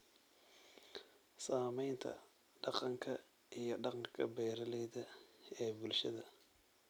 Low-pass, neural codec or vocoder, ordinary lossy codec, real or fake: none; vocoder, 44.1 kHz, 128 mel bands every 256 samples, BigVGAN v2; none; fake